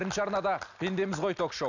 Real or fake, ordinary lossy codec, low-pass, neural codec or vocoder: real; none; 7.2 kHz; none